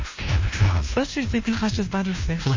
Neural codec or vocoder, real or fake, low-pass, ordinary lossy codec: codec, 16 kHz, 1 kbps, FunCodec, trained on LibriTTS, 50 frames a second; fake; 7.2 kHz; MP3, 32 kbps